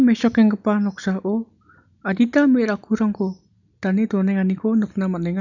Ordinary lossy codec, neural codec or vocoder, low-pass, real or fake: none; none; 7.2 kHz; real